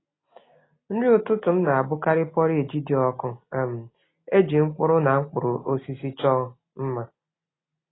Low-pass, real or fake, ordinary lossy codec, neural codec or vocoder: 7.2 kHz; real; AAC, 16 kbps; none